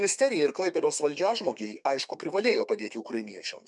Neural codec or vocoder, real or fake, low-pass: codec, 32 kHz, 1.9 kbps, SNAC; fake; 10.8 kHz